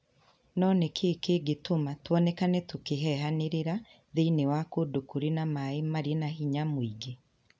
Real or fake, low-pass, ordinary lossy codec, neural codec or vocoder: real; none; none; none